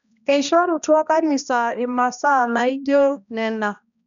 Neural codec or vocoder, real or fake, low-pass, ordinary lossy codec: codec, 16 kHz, 1 kbps, X-Codec, HuBERT features, trained on balanced general audio; fake; 7.2 kHz; none